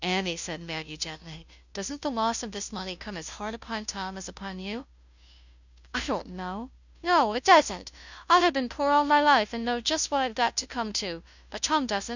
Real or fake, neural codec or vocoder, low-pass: fake; codec, 16 kHz, 0.5 kbps, FunCodec, trained on Chinese and English, 25 frames a second; 7.2 kHz